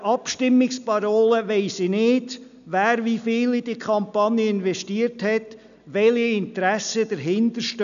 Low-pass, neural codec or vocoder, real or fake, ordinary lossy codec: 7.2 kHz; none; real; none